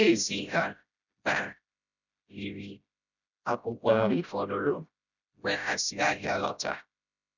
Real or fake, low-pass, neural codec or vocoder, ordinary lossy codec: fake; 7.2 kHz; codec, 16 kHz, 0.5 kbps, FreqCodec, smaller model; none